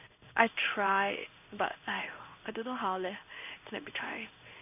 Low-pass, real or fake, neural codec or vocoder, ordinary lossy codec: 3.6 kHz; fake; codec, 16 kHz in and 24 kHz out, 1 kbps, XY-Tokenizer; none